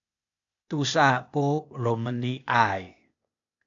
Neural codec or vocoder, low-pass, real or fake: codec, 16 kHz, 0.8 kbps, ZipCodec; 7.2 kHz; fake